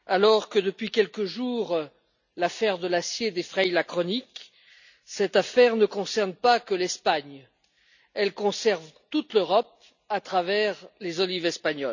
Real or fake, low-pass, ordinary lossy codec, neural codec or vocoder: real; 7.2 kHz; none; none